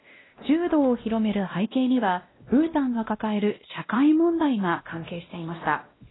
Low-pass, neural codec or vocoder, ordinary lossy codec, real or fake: 7.2 kHz; codec, 16 kHz, 1 kbps, X-Codec, WavLM features, trained on Multilingual LibriSpeech; AAC, 16 kbps; fake